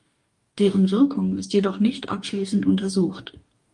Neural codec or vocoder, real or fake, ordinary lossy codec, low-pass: codec, 44.1 kHz, 2.6 kbps, DAC; fake; Opus, 32 kbps; 10.8 kHz